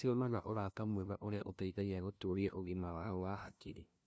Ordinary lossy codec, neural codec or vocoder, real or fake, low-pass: none; codec, 16 kHz, 0.5 kbps, FunCodec, trained on LibriTTS, 25 frames a second; fake; none